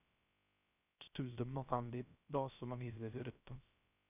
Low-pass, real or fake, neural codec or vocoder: 3.6 kHz; fake; codec, 16 kHz, 0.3 kbps, FocalCodec